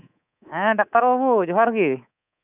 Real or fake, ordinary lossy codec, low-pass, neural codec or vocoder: fake; none; 3.6 kHz; codec, 24 kHz, 3.1 kbps, DualCodec